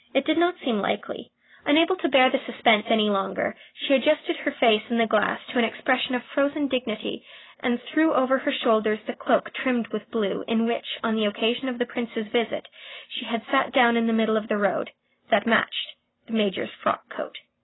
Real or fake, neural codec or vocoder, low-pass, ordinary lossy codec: real; none; 7.2 kHz; AAC, 16 kbps